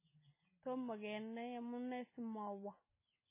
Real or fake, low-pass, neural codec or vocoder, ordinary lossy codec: real; 3.6 kHz; none; MP3, 16 kbps